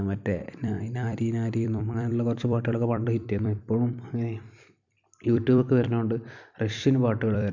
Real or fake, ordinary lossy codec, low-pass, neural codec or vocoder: fake; none; 7.2 kHz; vocoder, 44.1 kHz, 128 mel bands every 256 samples, BigVGAN v2